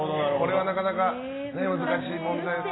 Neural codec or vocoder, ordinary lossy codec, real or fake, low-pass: none; AAC, 16 kbps; real; 7.2 kHz